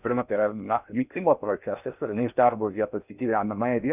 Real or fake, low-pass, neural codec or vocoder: fake; 3.6 kHz; codec, 16 kHz in and 24 kHz out, 0.6 kbps, FocalCodec, streaming, 4096 codes